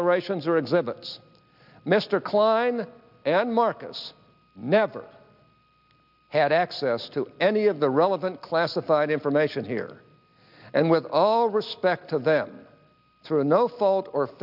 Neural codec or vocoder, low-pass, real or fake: none; 5.4 kHz; real